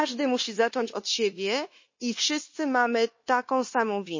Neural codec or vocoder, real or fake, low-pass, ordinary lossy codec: codec, 16 kHz, 0.9 kbps, LongCat-Audio-Codec; fake; 7.2 kHz; MP3, 32 kbps